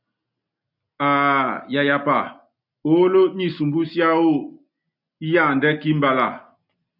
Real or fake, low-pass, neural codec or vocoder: real; 5.4 kHz; none